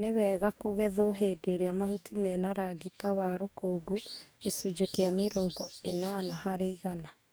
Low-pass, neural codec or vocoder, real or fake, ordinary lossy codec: none; codec, 44.1 kHz, 2.6 kbps, DAC; fake; none